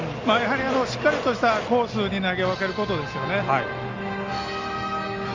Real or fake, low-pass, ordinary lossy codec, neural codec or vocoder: real; 7.2 kHz; Opus, 32 kbps; none